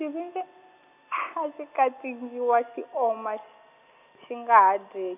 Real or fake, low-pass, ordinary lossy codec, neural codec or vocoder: real; 3.6 kHz; none; none